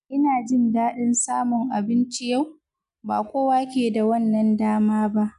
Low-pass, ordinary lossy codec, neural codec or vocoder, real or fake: 10.8 kHz; none; none; real